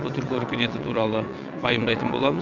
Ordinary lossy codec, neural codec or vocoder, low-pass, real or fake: none; vocoder, 22.05 kHz, 80 mel bands, WaveNeXt; 7.2 kHz; fake